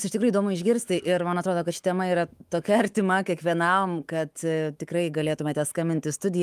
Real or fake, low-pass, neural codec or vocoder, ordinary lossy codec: fake; 14.4 kHz; autoencoder, 48 kHz, 128 numbers a frame, DAC-VAE, trained on Japanese speech; Opus, 32 kbps